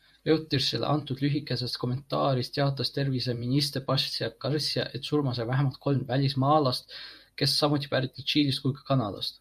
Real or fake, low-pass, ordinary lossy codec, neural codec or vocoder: fake; 14.4 kHz; AAC, 96 kbps; vocoder, 48 kHz, 128 mel bands, Vocos